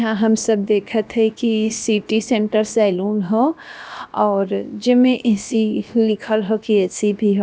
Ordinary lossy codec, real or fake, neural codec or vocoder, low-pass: none; fake; codec, 16 kHz, about 1 kbps, DyCAST, with the encoder's durations; none